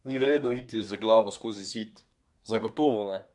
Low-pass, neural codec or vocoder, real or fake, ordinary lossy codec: 10.8 kHz; codec, 24 kHz, 1 kbps, SNAC; fake; MP3, 96 kbps